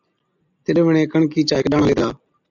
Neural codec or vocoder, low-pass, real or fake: none; 7.2 kHz; real